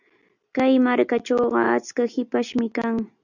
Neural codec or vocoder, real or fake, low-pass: none; real; 7.2 kHz